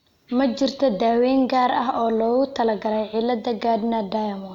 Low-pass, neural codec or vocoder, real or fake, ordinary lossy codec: 19.8 kHz; none; real; none